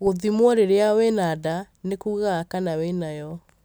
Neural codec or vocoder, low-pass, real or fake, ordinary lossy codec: none; none; real; none